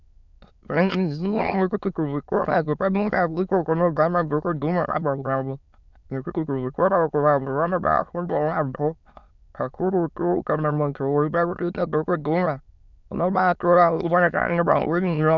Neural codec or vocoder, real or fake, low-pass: autoencoder, 22.05 kHz, a latent of 192 numbers a frame, VITS, trained on many speakers; fake; 7.2 kHz